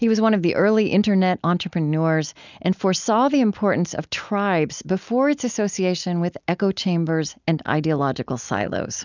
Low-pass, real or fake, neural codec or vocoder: 7.2 kHz; real; none